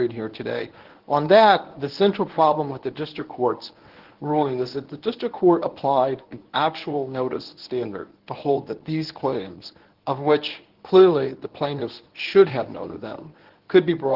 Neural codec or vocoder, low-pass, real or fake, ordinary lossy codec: codec, 24 kHz, 0.9 kbps, WavTokenizer, medium speech release version 1; 5.4 kHz; fake; Opus, 16 kbps